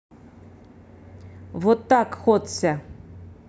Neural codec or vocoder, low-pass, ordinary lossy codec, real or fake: none; none; none; real